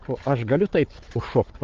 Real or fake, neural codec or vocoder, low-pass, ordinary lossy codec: fake; codec, 16 kHz, 4 kbps, FunCodec, trained on Chinese and English, 50 frames a second; 7.2 kHz; Opus, 16 kbps